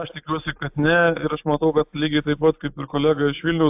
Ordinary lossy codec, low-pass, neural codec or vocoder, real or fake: AAC, 32 kbps; 3.6 kHz; none; real